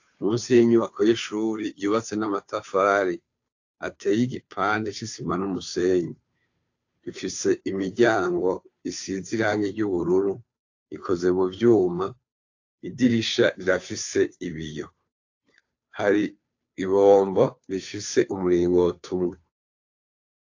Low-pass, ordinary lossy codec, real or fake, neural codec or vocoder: 7.2 kHz; AAC, 48 kbps; fake; codec, 16 kHz, 2 kbps, FunCodec, trained on Chinese and English, 25 frames a second